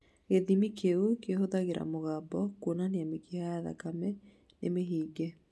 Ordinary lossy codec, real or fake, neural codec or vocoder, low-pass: none; real; none; none